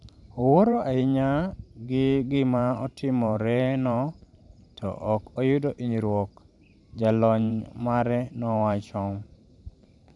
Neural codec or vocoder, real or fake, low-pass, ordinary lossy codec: vocoder, 44.1 kHz, 128 mel bands every 512 samples, BigVGAN v2; fake; 10.8 kHz; none